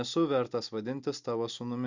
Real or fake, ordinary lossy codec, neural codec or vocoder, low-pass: real; Opus, 64 kbps; none; 7.2 kHz